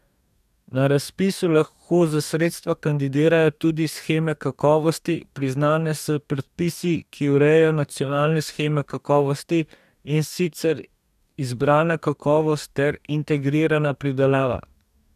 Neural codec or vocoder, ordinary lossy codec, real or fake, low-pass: codec, 44.1 kHz, 2.6 kbps, DAC; none; fake; 14.4 kHz